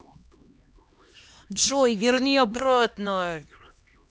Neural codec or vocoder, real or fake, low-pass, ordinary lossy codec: codec, 16 kHz, 1 kbps, X-Codec, HuBERT features, trained on LibriSpeech; fake; none; none